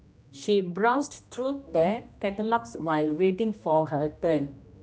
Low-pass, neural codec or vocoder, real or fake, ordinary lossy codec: none; codec, 16 kHz, 1 kbps, X-Codec, HuBERT features, trained on general audio; fake; none